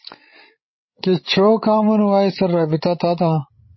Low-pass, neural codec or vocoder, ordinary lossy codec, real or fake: 7.2 kHz; codec, 16 kHz, 16 kbps, FreqCodec, larger model; MP3, 24 kbps; fake